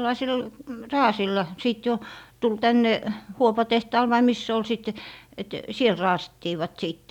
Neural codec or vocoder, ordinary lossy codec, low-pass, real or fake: none; none; 19.8 kHz; real